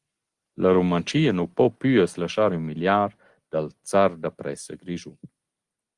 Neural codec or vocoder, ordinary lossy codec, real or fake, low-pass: none; Opus, 24 kbps; real; 10.8 kHz